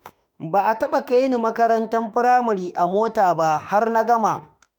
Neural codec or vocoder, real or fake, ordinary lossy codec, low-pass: autoencoder, 48 kHz, 32 numbers a frame, DAC-VAE, trained on Japanese speech; fake; none; none